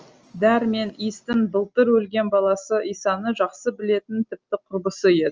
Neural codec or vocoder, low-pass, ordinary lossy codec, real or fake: none; 7.2 kHz; Opus, 24 kbps; real